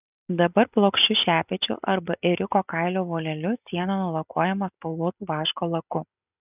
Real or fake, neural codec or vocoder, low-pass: real; none; 3.6 kHz